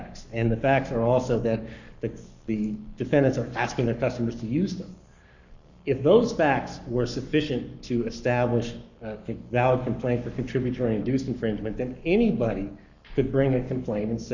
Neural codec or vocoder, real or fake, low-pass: codec, 44.1 kHz, 7.8 kbps, Pupu-Codec; fake; 7.2 kHz